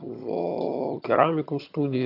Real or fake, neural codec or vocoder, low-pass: fake; vocoder, 22.05 kHz, 80 mel bands, HiFi-GAN; 5.4 kHz